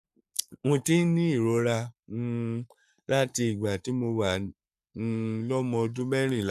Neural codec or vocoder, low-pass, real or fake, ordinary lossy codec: codec, 44.1 kHz, 7.8 kbps, Pupu-Codec; 14.4 kHz; fake; none